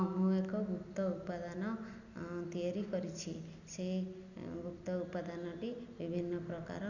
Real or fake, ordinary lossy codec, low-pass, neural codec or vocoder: real; none; 7.2 kHz; none